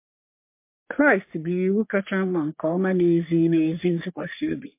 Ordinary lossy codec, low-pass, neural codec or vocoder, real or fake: MP3, 24 kbps; 3.6 kHz; codec, 44.1 kHz, 3.4 kbps, Pupu-Codec; fake